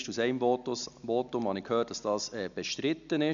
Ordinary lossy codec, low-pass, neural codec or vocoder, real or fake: none; 7.2 kHz; none; real